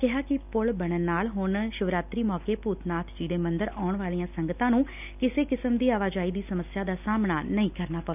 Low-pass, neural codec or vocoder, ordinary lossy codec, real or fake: 3.6 kHz; none; none; real